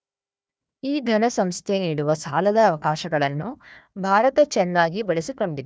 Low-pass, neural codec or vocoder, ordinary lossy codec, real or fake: none; codec, 16 kHz, 1 kbps, FunCodec, trained on Chinese and English, 50 frames a second; none; fake